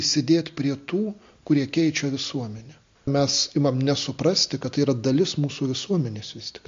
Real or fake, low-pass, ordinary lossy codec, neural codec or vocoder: real; 7.2 kHz; MP3, 48 kbps; none